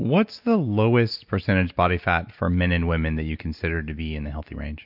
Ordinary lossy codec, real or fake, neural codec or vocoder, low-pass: MP3, 48 kbps; real; none; 5.4 kHz